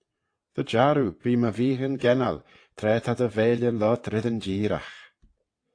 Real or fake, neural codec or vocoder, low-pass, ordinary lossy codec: fake; vocoder, 22.05 kHz, 80 mel bands, WaveNeXt; 9.9 kHz; AAC, 48 kbps